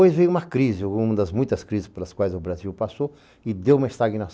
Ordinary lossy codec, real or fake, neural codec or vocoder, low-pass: none; real; none; none